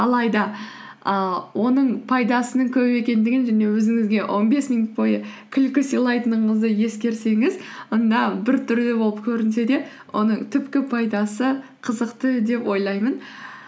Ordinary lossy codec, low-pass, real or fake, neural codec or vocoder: none; none; real; none